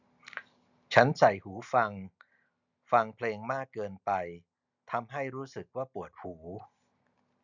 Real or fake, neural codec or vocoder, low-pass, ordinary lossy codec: real; none; 7.2 kHz; none